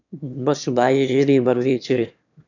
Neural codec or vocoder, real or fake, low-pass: autoencoder, 22.05 kHz, a latent of 192 numbers a frame, VITS, trained on one speaker; fake; 7.2 kHz